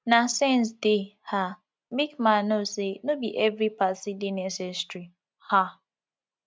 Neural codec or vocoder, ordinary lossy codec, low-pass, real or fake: none; none; none; real